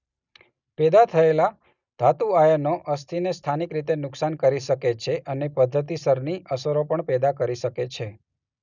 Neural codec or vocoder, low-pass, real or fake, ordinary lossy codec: none; 7.2 kHz; real; none